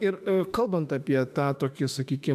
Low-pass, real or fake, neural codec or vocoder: 14.4 kHz; fake; autoencoder, 48 kHz, 32 numbers a frame, DAC-VAE, trained on Japanese speech